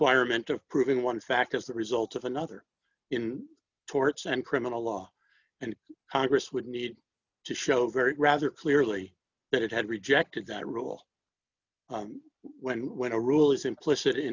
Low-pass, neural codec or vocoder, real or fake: 7.2 kHz; none; real